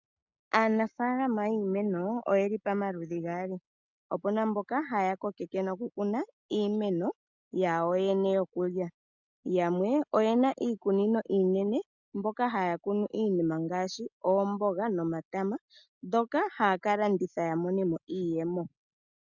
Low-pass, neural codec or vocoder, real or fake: 7.2 kHz; none; real